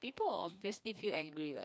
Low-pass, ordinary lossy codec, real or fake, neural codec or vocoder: none; none; fake; codec, 16 kHz, 2 kbps, FreqCodec, larger model